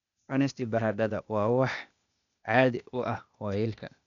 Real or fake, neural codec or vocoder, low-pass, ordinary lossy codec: fake; codec, 16 kHz, 0.8 kbps, ZipCodec; 7.2 kHz; none